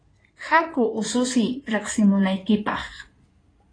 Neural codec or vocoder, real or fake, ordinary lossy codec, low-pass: codec, 16 kHz in and 24 kHz out, 2.2 kbps, FireRedTTS-2 codec; fake; AAC, 32 kbps; 9.9 kHz